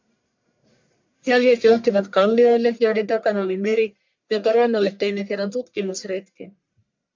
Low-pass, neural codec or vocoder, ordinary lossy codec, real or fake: 7.2 kHz; codec, 44.1 kHz, 1.7 kbps, Pupu-Codec; MP3, 64 kbps; fake